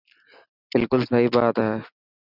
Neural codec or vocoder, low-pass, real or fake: none; 5.4 kHz; real